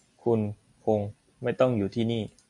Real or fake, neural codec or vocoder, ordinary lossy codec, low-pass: real; none; AAC, 64 kbps; 10.8 kHz